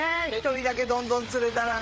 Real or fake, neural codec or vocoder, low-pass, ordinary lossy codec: fake; codec, 16 kHz, 8 kbps, FreqCodec, larger model; none; none